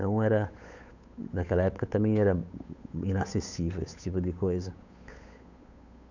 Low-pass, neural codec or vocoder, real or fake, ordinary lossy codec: 7.2 kHz; codec, 16 kHz, 8 kbps, FunCodec, trained on Chinese and English, 25 frames a second; fake; none